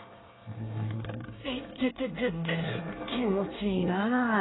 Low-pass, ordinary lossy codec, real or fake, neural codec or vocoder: 7.2 kHz; AAC, 16 kbps; fake; codec, 24 kHz, 1 kbps, SNAC